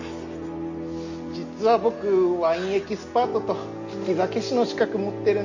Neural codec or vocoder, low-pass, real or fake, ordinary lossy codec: none; 7.2 kHz; real; Opus, 64 kbps